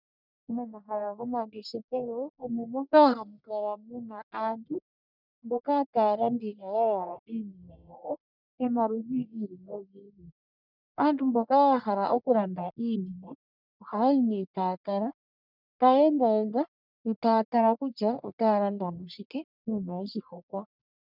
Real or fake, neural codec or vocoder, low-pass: fake; codec, 44.1 kHz, 1.7 kbps, Pupu-Codec; 5.4 kHz